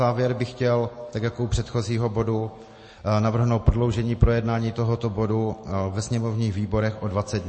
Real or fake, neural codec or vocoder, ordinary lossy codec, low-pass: real; none; MP3, 32 kbps; 9.9 kHz